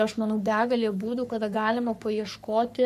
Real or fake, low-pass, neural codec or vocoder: fake; 14.4 kHz; codec, 44.1 kHz, 3.4 kbps, Pupu-Codec